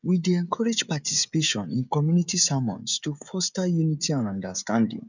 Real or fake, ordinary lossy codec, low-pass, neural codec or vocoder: fake; none; 7.2 kHz; codec, 16 kHz, 16 kbps, FreqCodec, smaller model